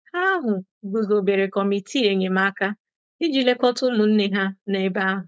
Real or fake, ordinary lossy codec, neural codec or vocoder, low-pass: fake; none; codec, 16 kHz, 4.8 kbps, FACodec; none